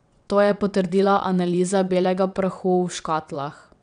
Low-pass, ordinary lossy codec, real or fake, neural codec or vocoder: 9.9 kHz; none; fake; vocoder, 22.05 kHz, 80 mel bands, WaveNeXt